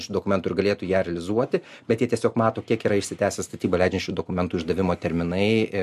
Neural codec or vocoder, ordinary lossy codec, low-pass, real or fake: none; MP3, 64 kbps; 14.4 kHz; real